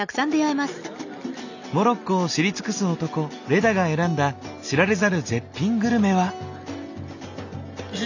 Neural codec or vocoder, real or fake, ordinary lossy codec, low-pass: none; real; none; 7.2 kHz